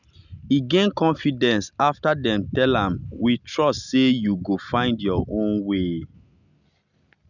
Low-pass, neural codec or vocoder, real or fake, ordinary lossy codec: 7.2 kHz; none; real; none